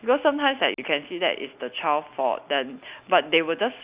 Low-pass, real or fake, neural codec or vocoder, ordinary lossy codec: 3.6 kHz; real; none; Opus, 64 kbps